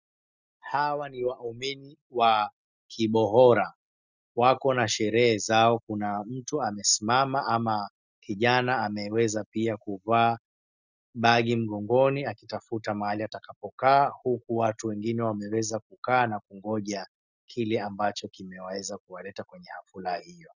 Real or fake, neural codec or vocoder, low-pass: real; none; 7.2 kHz